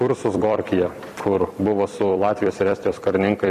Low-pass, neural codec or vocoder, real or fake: 14.4 kHz; vocoder, 44.1 kHz, 128 mel bands every 256 samples, BigVGAN v2; fake